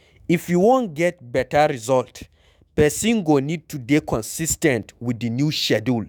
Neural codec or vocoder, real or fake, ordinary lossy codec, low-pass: autoencoder, 48 kHz, 128 numbers a frame, DAC-VAE, trained on Japanese speech; fake; none; none